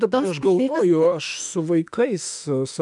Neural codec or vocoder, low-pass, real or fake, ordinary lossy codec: autoencoder, 48 kHz, 32 numbers a frame, DAC-VAE, trained on Japanese speech; 10.8 kHz; fake; MP3, 96 kbps